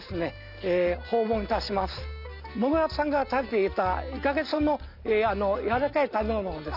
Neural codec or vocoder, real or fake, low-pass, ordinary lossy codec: none; real; 5.4 kHz; none